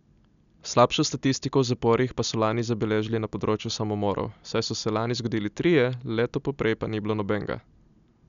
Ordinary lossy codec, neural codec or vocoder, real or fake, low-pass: none; none; real; 7.2 kHz